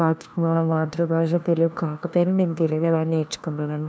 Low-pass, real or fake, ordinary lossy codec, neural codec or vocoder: none; fake; none; codec, 16 kHz, 1 kbps, FunCodec, trained on LibriTTS, 50 frames a second